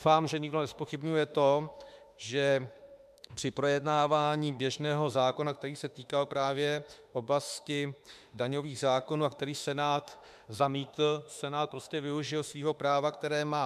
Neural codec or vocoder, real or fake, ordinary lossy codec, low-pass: autoencoder, 48 kHz, 32 numbers a frame, DAC-VAE, trained on Japanese speech; fake; MP3, 96 kbps; 14.4 kHz